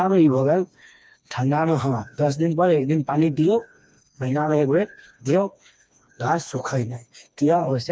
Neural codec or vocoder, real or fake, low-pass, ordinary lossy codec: codec, 16 kHz, 2 kbps, FreqCodec, smaller model; fake; none; none